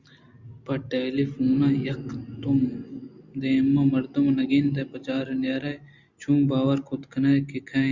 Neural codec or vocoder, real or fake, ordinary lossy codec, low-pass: none; real; Opus, 64 kbps; 7.2 kHz